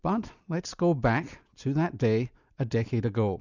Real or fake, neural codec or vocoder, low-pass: real; none; 7.2 kHz